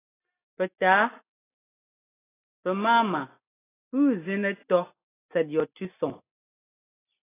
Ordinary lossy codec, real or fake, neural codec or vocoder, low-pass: AAC, 16 kbps; real; none; 3.6 kHz